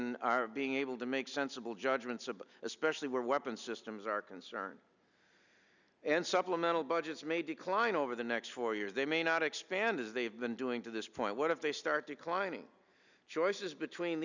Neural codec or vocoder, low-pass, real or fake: none; 7.2 kHz; real